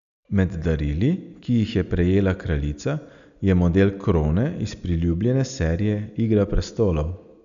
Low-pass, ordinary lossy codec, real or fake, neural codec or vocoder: 7.2 kHz; none; real; none